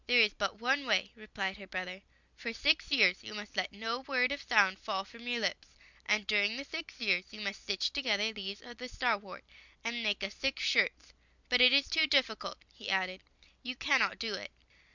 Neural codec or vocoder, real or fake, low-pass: none; real; 7.2 kHz